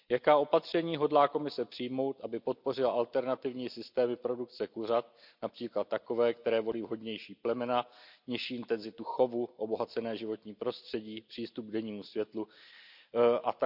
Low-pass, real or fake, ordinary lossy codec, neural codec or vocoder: 5.4 kHz; real; none; none